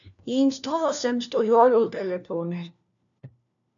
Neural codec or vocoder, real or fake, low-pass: codec, 16 kHz, 1 kbps, FunCodec, trained on LibriTTS, 50 frames a second; fake; 7.2 kHz